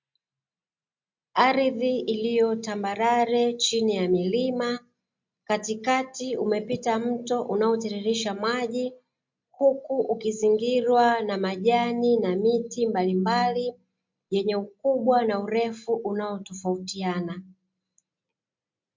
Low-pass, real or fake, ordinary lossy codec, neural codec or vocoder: 7.2 kHz; real; MP3, 48 kbps; none